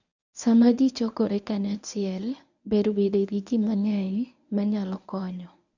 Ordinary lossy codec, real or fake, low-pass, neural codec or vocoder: none; fake; 7.2 kHz; codec, 24 kHz, 0.9 kbps, WavTokenizer, medium speech release version 1